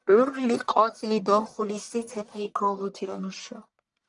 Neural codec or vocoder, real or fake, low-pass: codec, 44.1 kHz, 1.7 kbps, Pupu-Codec; fake; 10.8 kHz